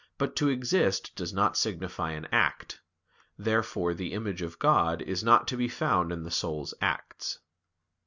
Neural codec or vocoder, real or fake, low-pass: none; real; 7.2 kHz